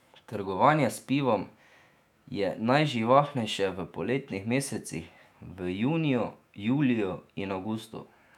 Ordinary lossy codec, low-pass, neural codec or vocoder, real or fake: none; 19.8 kHz; autoencoder, 48 kHz, 128 numbers a frame, DAC-VAE, trained on Japanese speech; fake